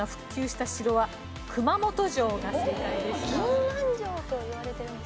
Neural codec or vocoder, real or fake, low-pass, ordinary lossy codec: none; real; none; none